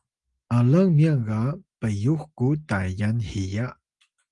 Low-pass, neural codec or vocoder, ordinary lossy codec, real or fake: 10.8 kHz; none; Opus, 32 kbps; real